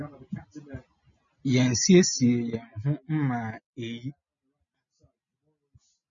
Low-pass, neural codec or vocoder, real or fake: 7.2 kHz; none; real